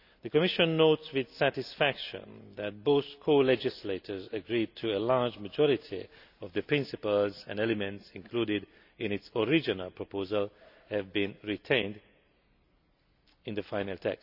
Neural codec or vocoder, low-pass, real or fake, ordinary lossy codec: none; 5.4 kHz; real; none